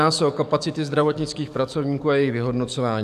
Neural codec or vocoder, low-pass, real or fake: codec, 44.1 kHz, 7.8 kbps, DAC; 14.4 kHz; fake